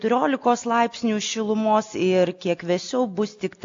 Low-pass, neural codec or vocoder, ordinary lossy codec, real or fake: 7.2 kHz; none; AAC, 48 kbps; real